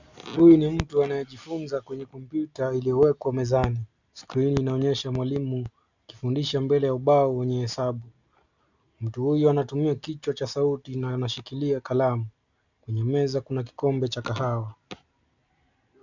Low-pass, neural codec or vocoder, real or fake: 7.2 kHz; none; real